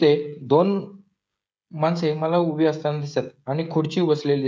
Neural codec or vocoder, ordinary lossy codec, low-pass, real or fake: codec, 16 kHz, 16 kbps, FreqCodec, smaller model; none; none; fake